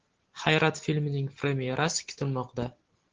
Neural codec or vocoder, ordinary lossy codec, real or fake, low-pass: none; Opus, 16 kbps; real; 7.2 kHz